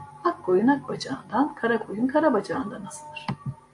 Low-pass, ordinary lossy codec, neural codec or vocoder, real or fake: 10.8 kHz; AAC, 64 kbps; none; real